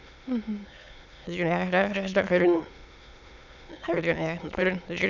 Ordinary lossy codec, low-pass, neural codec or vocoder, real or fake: none; 7.2 kHz; autoencoder, 22.05 kHz, a latent of 192 numbers a frame, VITS, trained on many speakers; fake